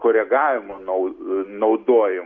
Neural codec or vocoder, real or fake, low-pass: none; real; 7.2 kHz